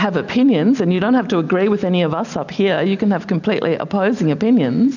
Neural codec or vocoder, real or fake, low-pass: none; real; 7.2 kHz